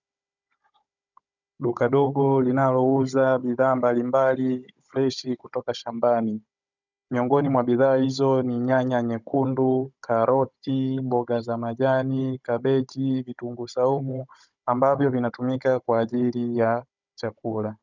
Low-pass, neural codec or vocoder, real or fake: 7.2 kHz; codec, 16 kHz, 16 kbps, FunCodec, trained on Chinese and English, 50 frames a second; fake